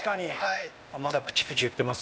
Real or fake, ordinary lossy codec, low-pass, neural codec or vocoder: fake; none; none; codec, 16 kHz, 0.8 kbps, ZipCodec